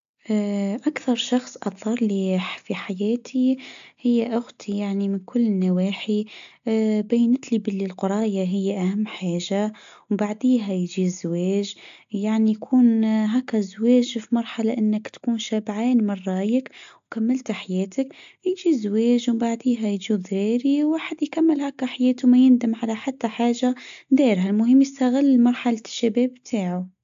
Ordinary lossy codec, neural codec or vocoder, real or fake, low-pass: AAC, 64 kbps; none; real; 7.2 kHz